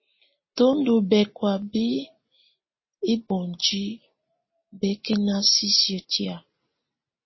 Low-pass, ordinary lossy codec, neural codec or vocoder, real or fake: 7.2 kHz; MP3, 24 kbps; none; real